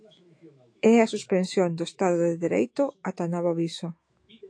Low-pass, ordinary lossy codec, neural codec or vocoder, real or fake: 9.9 kHz; AAC, 48 kbps; autoencoder, 48 kHz, 128 numbers a frame, DAC-VAE, trained on Japanese speech; fake